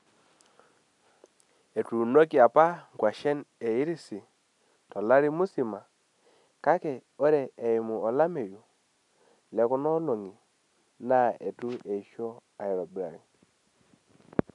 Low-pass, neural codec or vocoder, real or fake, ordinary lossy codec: 10.8 kHz; none; real; none